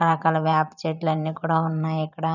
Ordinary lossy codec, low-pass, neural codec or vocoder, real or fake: none; 7.2 kHz; vocoder, 44.1 kHz, 128 mel bands every 512 samples, BigVGAN v2; fake